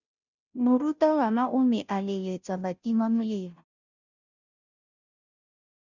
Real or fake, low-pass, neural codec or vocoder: fake; 7.2 kHz; codec, 16 kHz, 0.5 kbps, FunCodec, trained on Chinese and English, 25 frames a second